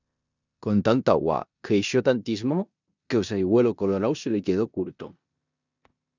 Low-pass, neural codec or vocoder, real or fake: 7.2 kHz; codec, 16 kHz in and 24 kHz out, 0.9 kbps, LongCat-Audio-Codec, four codebook decoder; fake